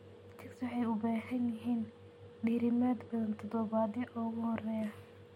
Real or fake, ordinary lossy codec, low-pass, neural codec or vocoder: real; MP3, 64 kbps; 19.8 kHz; none